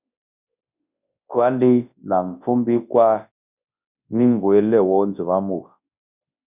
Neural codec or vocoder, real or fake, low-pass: codec, 24 kHz, 0.9 kbps, WavTokenizer, large speech release; fake; 3.6 kHz